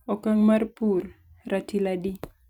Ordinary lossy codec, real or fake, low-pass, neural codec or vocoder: none; fake; 19.8 kHz; vocoder, 44.1 kHz, 128 mel bands every 256 samples, BigVGAN v2